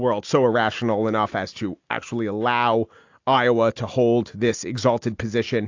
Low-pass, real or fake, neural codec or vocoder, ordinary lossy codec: 7.2 kHz; real; none; AAC, 48 kbps